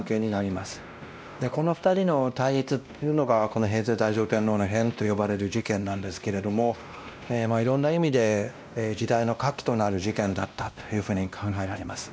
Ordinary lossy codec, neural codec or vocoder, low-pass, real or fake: none; codec, 16 kHz, 1 kbps, X-Codec, WavLM features, trained on Multilingual LibriSpeech; none; fake